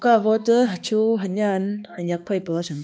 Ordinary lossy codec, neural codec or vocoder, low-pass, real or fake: none; codec, 16 kHz, 2 kbps, X-Codec, HuBERT features, trained on balanced general audio; none; fake